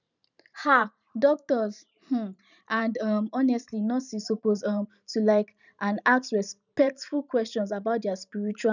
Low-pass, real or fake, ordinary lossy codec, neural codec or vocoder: 7.2 kHz; fake; none; vocoder, 44.1 kHz, 128 mel bands every 512 samples, BigVGAN v2